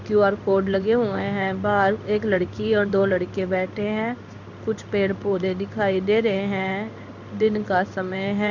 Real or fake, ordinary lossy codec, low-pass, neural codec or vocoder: fake; none; 7.2 kHz; codec, 16 kHz in and 24 kHz out, 1 kbps, XY-Tokenizer